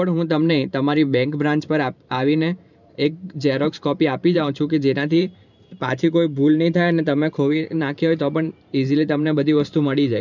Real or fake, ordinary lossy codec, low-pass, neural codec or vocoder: fake; none; 7.2 kHz; vocoder, 44.1 kHz, 128 mel bands every 512 samples, BigVGAN v2